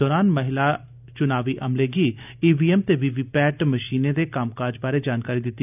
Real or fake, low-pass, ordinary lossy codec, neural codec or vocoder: real; 3.6 kHz; none; none